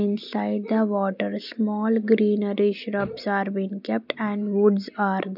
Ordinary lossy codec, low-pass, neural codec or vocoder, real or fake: none; 5.4 kHz; none; real